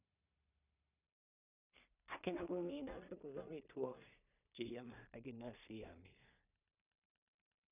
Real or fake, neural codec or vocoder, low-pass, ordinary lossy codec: fake; codec, 16 kHz in and 24 kHz out, 0.4 kbps, LongCat-Audio-Codec, two codebook decoder; 3.6 kHz; none